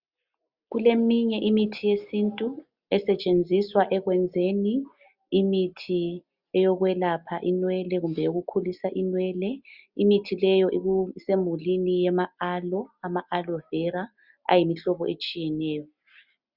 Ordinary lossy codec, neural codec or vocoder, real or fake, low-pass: Opus, 64 kbps; none; real; 5.4 kHz